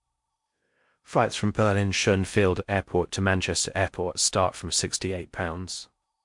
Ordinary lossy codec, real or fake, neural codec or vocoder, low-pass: MP3, 64 kbps; fake; codec, 16 kHz in and 24 kHz out, 0.6 kbps, FocalCodec, streaming, 4096 codes; 10.8 kHz